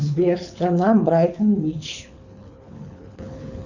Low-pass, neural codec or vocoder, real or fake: 7.2 kHz; codec, 24 kHz, 6 kbps, HILCodec; fake